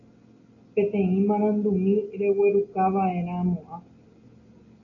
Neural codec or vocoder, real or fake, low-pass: none; real; 7.2 kHz